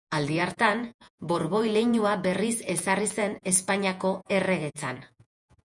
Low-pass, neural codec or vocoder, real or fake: 10.8 kHz; vocoder, 48 kHz, 128 mel bands, Vocos; fake